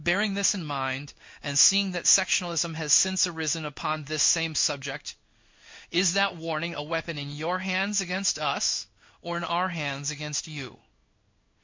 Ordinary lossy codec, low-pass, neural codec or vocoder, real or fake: MP3, 48 kbps; 7.2 kHz; codec, 16 kHz in and 24 kHz out, 1 kbps, XY-Tokenizer; fake